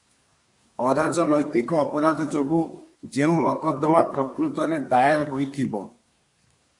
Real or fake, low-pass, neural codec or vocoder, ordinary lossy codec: fake; 10.8 kHz; codec, 24 kHz, 1 kbps, SNAC; AAC, 64 kbps